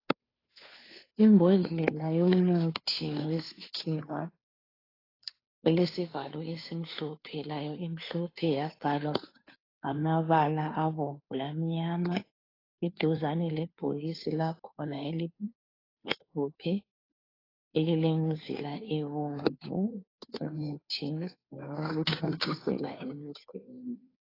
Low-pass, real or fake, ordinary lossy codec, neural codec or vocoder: 5.4 kHz; fake; AAC, 24 kbps; codec, 16 kHz, 2 kbps, FunCodec, trained on Chinese and English, 25 frames a second